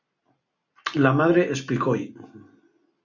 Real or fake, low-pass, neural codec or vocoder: real; 7.2 kHz; none